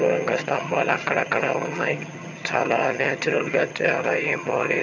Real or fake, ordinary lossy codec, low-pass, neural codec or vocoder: fake; none; 7.2 kHz; vocoder, 22.05 kHz, 80 mel bands, HiFi-GAN